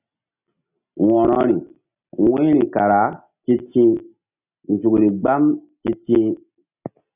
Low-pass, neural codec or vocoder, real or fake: 3.6 kHz; none; real